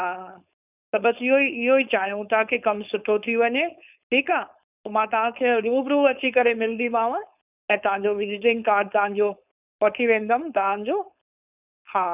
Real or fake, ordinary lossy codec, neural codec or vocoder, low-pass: fake; none; codec, 16 kHz, 4.8 kbps, FACodec; 3.6 kHz